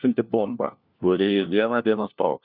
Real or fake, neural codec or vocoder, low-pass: fake; codec, 16 kHz, 1 kbps, FunCodec, trained on LibriTTS, 50 frames a second; 5.4 kHz